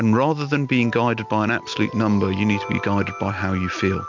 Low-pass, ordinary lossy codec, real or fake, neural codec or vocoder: 7.2 kHz; MP3, 64 kbps; real; none